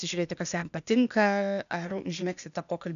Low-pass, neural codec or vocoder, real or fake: 7.2 kHz; codec, 16 kHz, 0.8 kbps, ZipCodec; fake